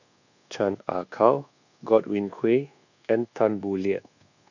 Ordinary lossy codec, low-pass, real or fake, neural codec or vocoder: none; 7.2 kHz; fake; codec, 24 kHz, 1.2 kbps, DualCodec